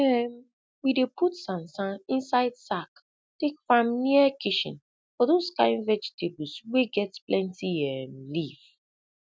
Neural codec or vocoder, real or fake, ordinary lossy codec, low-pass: none; real; none; none